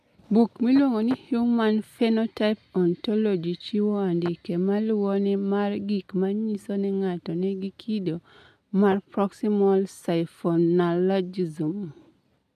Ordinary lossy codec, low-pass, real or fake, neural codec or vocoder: MP3, 96 kbps; 14.4 kHz; real; none